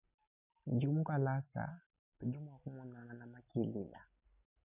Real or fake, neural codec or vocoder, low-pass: real; none; 3.6 kHz